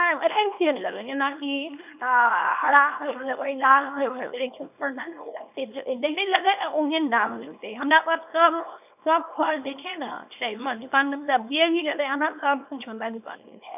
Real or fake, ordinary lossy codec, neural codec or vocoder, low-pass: fake; none; codec, 24 kHz, 0.9 kbps, WavTokenizer, small release; 3.6 kHz